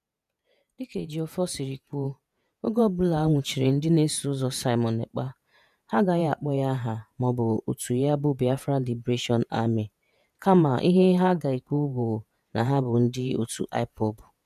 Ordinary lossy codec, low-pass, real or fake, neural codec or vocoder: none; 14.4 kHz; fake; vocoder, 48 kHz, 128 mel bands, Vocos